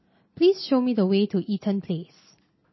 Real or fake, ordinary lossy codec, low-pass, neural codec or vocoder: real; MP3, 24 kbps; 7.2 kHz; none